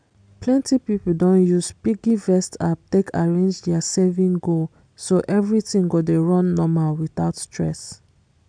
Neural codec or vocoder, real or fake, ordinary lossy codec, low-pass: none; real; MP3, 96 kbps; 9.9 kHz